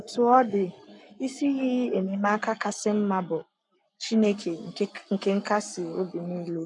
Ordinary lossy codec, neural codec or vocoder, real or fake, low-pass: none; codec, 44.1 kHz, 7.8 kbps, Pupu-Codec; fake; 10.8 kHz